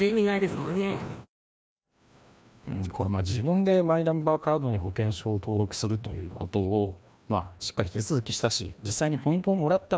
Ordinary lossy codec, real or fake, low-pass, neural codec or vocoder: none; fake; none; codec, 16 kHz, 1 kbps, FreqCodec, larger model